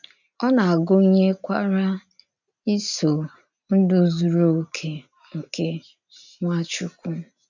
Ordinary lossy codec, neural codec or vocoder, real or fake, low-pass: none; none; real; 7.2 kHz